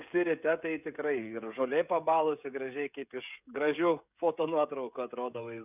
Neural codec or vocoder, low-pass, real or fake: codec, 16 kHz, 16 kbps, FreqCodec, smaller model; 3.6 kHz; fake